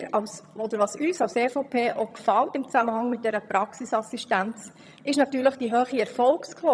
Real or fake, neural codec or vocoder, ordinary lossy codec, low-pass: fake; vocoder, 22.05 kHz, 80 mel bands, HiFi-GAN; none; none